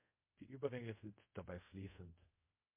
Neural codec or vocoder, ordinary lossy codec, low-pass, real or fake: codec, 24 kHz, 0.5 kbps, DualCodec; MP3, 24 kbps; 3.6 kHz; fake